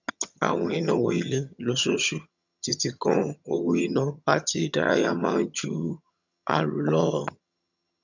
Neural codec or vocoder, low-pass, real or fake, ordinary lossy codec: vocoder, 22.05 kHz, 80 mel bands, HiFi-GAN; 7.2 kHz; fake; none